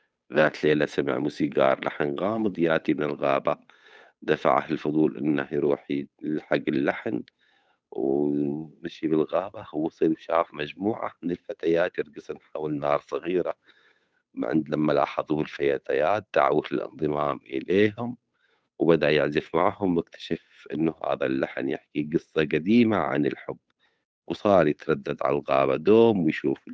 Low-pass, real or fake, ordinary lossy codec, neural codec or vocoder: none; fake; none; codec, 16 kHz, 8 kbps, FunCodec, trained on Chinese and English, 25 frames a second